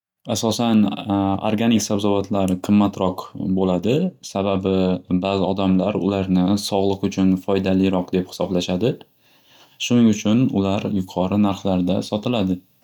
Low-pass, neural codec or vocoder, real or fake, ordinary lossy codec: 19.8 kHz; none; real; none